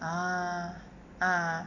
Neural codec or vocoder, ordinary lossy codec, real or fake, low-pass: none; none; real; 7.2 kHz